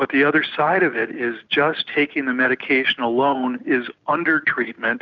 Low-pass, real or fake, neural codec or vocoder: 7.2 kHz; real; none